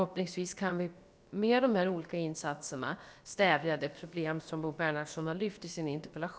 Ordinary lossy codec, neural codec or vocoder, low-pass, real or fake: none; codec, 16 kHz, about 1 kbps, DyCAST, with the encoder's durations; none; fake